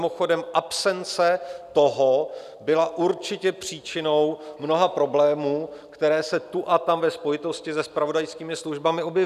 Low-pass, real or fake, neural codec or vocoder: 14.4 kHz; real; none